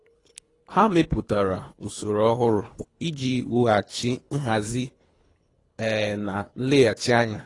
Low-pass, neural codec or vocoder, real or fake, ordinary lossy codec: 10.8 kHz; codec, 24 kHz, 3 kbps, HILCodec; fake; AAC, 32 kbps